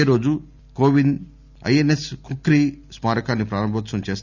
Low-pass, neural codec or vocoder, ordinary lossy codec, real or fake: 7.2 kHz; none; none; real